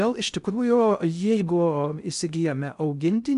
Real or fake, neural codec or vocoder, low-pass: fake; codec, 16 kHz in and 24 kHz out, 0.6 kbps, FocalCodec, streaming, 2048 codes; 10.8 kHz